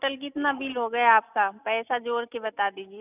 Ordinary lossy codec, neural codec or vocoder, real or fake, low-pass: none; none; real; 3.6 kHz